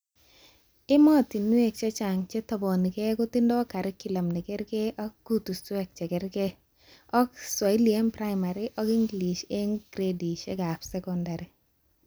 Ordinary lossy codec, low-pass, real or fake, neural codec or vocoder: none; none; real; none